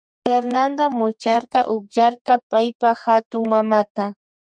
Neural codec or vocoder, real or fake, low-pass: codec, 32 kHz, 1.9 kbps, SNAC; fake; 9.9 kHz